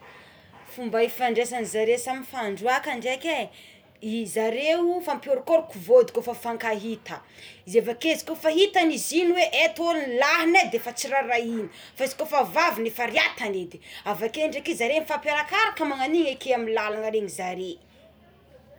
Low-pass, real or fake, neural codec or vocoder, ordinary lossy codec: none; real; none; none